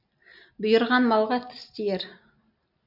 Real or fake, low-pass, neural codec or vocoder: real; 5.4 kHz; none